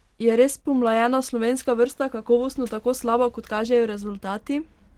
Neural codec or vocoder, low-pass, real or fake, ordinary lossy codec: none; 19.8 kHz; real; Opus, 16 kbps